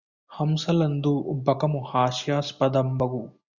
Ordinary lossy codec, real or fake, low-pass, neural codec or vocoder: Opus, 64 kbps; real; 7.2 kHz; none